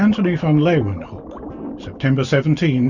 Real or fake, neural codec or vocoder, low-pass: real; none; 7.2 kHz